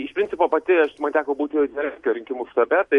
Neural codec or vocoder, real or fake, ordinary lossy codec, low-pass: none; real; MP3, 48 kbps; 14.4 kHz